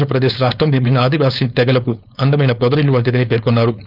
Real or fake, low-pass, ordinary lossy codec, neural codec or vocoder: fake; 5.4 kHz; none; codec, 16 kHz, 4.8 kbps, FACodec